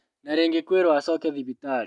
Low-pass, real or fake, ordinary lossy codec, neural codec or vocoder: 10.8 kHz; real; none; none